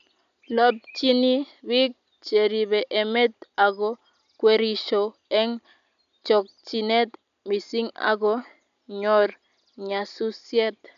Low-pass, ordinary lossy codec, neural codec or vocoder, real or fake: 7.2 kHz; none; none; real